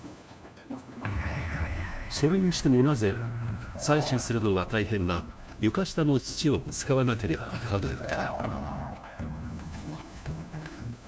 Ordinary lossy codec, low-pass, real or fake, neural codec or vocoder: none; none; fake; codec, 16 kHz, 1 kbps, FunCodec, trained on LibriTTS, 50 frames a second